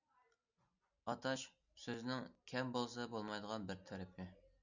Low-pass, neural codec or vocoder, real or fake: 7.2 kHz; none; real